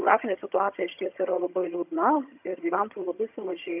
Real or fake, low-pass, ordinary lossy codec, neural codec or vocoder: fake; 3.6 kHz; AAC, 32 kbps; vocoder, 22.05 kHz, 80 mel bands, HiFi-GAN